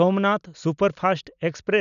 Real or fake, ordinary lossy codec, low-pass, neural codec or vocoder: real; none; 7.2 kHz; none